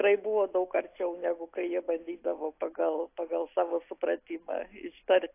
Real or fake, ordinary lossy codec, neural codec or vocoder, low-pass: real; AAC, 24 kbps; none; 3.6 kHz